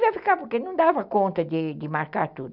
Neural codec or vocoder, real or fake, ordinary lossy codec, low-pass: none; real; none; 5.4 kHz